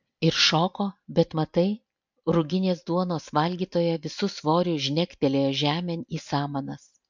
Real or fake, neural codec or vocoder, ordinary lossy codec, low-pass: real; none; MP3, 64 kbps; 7.2 kHz